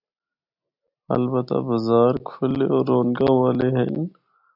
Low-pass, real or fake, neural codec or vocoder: 5.4 kHz; real; none